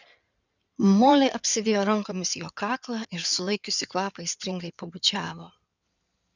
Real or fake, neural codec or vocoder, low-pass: fake; codec, 16 kHz in and 24 kHz out, 2.2 kbps, FireRedTTS-2 codec; 7.2 kHz